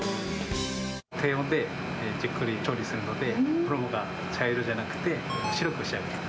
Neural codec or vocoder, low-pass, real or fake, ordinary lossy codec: none; none; real; none